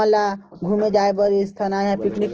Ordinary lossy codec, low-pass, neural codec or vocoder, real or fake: Opus, 16 kbps; 7.2 kHz; none; real